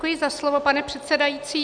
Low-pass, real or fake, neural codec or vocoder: 9.9 kHz; real; none